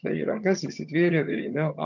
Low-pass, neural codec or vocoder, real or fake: 7.2 kHz; vocoder, 22.05 kHz, 80 mel bands, HiFi-GAN; fake